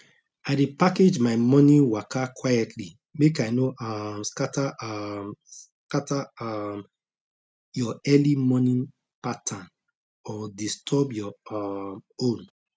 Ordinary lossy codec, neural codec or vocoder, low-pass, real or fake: none; none; none; real